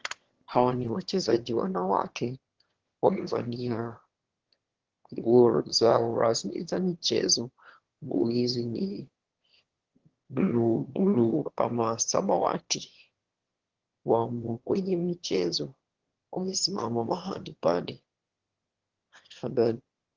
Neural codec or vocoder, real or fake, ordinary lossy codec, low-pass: autoencoder, 22.05 kHz, a latent of 192 numbers a frame, VITS, trained on one speaker; fake; Opus, 16 kbps; 7.2 kHz